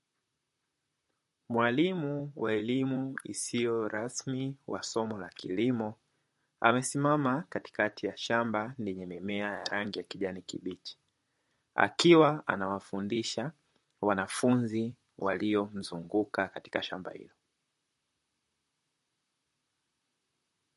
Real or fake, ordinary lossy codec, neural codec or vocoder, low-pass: fake; MP3, 48 kbps; vocoder, 44.1 kHz, 128 mel bands, Pupu-Vocoder; 14.4 kHz